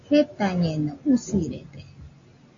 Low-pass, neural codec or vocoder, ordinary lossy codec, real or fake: 7.2 kHz; none; AAC, 48 kbps; real